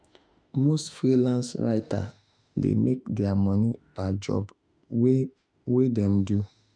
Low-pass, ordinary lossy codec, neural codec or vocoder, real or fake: 9.9 kHz; none; autoencoder, 48 kHz, 32 numbers a frame, DAC-VAE, trained on Japanese speech; fake